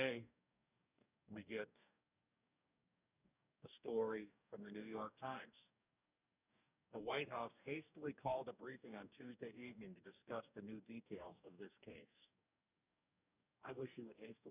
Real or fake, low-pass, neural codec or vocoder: fake; 3.6 kHz; codec, 44.1 kHz, 2.6 kbps, DAC